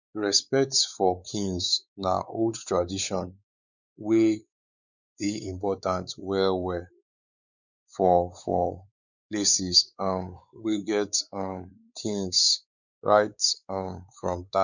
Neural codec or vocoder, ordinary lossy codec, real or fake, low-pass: codec, 16 kHz, 4 kbps, X-Codec, WavLM features, trained on Multilingual LibriSpeech; none; fake; 7.2 kHz